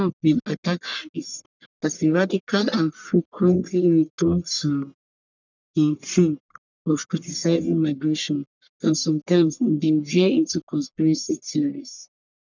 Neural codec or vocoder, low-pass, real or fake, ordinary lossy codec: codec, 44.1 kHz, 1.7 kbps, Pupu-Codec; 7.2 kHz; fake; none